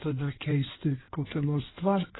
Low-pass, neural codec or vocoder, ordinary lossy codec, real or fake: 7.2 kHz; codec, 16 kHz in and 24 kHz out, 1.1 kbps, FireRedTTS-2 codec; AAC, 16 kbps; fake